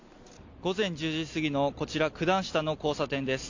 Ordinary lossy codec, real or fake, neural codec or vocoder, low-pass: AAC, 48 kbps; real; none; 7.2 kHz